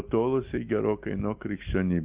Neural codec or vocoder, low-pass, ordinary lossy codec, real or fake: none; 3.6 kHz; Opus, 24 kbps; real